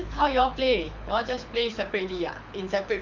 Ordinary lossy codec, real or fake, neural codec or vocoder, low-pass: none; fake; codec, 24 kHz, 6 kbps, HILCodec; 7.2 kHz